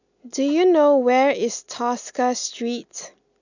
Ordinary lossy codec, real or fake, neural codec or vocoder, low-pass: none; real; none; 7.2 kHz